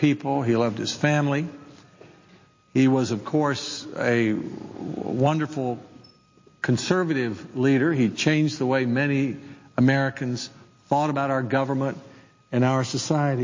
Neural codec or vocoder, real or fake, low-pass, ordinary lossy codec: none; real; 7.2 kHz; MP3, 32 kbps